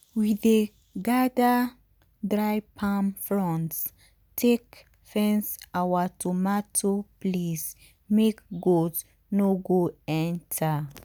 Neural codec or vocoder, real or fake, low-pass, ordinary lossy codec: none; real; none; none